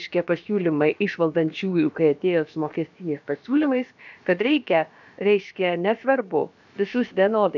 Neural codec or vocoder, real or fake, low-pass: codec, 16 kHz, about 1 kbps, DyCAST, with the encoder's durations; fake; 7.2 kHz